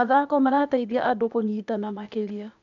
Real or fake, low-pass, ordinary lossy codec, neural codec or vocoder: fake; 7.2 kHz; none; codec, 16 kHz, 0.8 kbps, ZipCodec